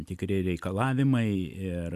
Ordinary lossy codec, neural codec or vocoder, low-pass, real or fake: AAC, 96 kbps; none; 14.4 kHz; real